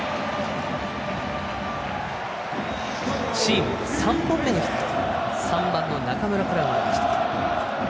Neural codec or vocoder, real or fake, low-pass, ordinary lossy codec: none; real; none; none